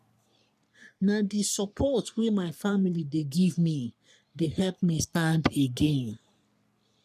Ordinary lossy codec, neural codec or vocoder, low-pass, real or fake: none; codec, 44.1 kHz, 3.4 kbps, Pupu-Codec; 14.4 kHz; fake